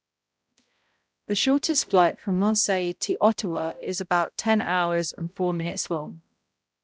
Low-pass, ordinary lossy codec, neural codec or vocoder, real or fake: none; none; codec, 16 kHz, 0.5 kbps, X-Codec, HuBERT features, trained on balanced general audio; fake